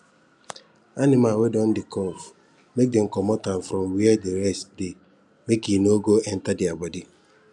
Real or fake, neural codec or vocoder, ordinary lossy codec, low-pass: real; none; none; 10.8 kHz